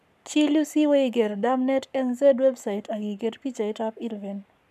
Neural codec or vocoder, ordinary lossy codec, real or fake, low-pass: codec, 44.1 kHz, 7.8 kbps, Pupu-Codec; none; fake; 14.4 kHz